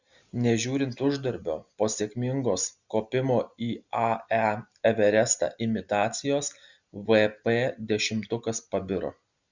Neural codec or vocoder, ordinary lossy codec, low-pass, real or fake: none; Opus, 64 kbps; 7.2 kHz; real